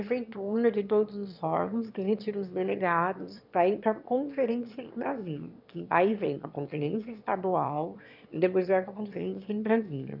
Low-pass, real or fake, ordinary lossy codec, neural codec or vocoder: 5.4 kHz; fake; none; autoencoder, 22.05 kHz, a latent of 192 numbers a frame, VITS, trained on one speaker